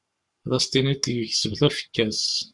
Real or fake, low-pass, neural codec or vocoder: fake; 10.8 kHz; codec, 44.1 kHz, 7.8 kbps, Pupu-Codec